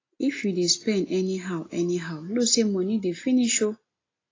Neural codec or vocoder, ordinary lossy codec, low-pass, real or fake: none; AAC, 32 kbps; 7.2 kHz; real